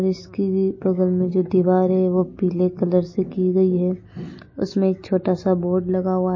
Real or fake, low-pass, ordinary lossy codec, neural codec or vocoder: real; 7.2 kHz; MP3, 32 kbps; none